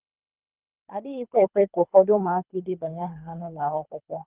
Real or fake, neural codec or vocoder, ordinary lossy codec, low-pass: fake; codec, 24 kHz, 3 kbps, HILCodec; Opus, 32 kbps; 3.6 kHz